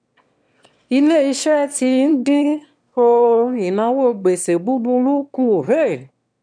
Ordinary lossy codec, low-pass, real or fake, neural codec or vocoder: none; 9.9 kHz; fake; autoencoder, 22.05 kHz, a latent of 192 numbers a frame, VITS, trained on one speaker